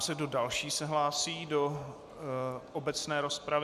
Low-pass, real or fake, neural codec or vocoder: 14.4 kHz; real; none